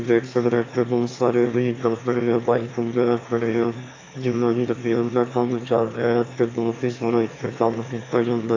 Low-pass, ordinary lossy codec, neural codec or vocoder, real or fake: 7.2 kHz; AAC, 32 kbps; autoencoder, 22.05 kHz, a latent of 192 numbers a frame, VITS, trained on one speaker; fake